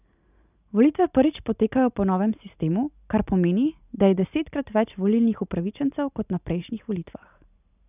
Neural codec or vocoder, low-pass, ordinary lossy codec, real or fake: none; 3.6 kHz; none; real